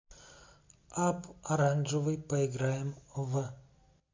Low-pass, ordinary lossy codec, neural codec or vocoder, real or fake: 7.2 kHz; MP3, 48 kbps; none; real